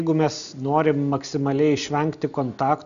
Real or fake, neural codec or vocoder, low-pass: real; none; 7.2 kHz